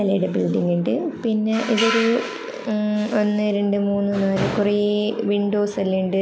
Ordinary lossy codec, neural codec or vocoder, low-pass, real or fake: none; none; none; real